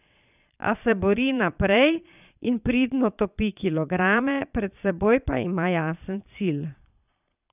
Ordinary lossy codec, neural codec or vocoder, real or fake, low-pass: none; vocoder, 22.05 kHz, 80 mel bands, Vocos; fake; 3.6 kHz